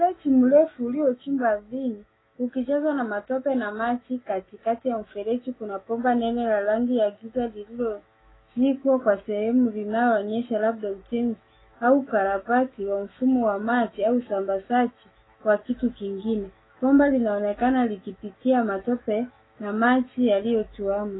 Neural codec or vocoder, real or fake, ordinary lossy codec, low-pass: autoencoder, 48 kHz, 128 numbers a frame, DAC-VAE, trained on Japanese speech; fake; AAC, 16 kbps; 7.2 kHz